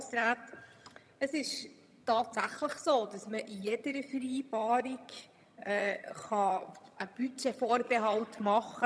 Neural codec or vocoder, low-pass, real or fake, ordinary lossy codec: vocoder, 22.05 kHz, 80 mel bands, HiFi-GAN; none; fake; none